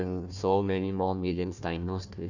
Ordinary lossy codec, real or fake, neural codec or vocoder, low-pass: none; fake; codec, 16 kHz, 1 kbps, FunCodec, trained on Chinese and English, 50 frames a second; 7.2 kHz